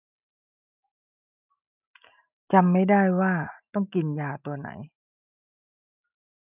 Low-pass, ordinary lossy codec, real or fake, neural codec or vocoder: 3.6 kHz; none; real; none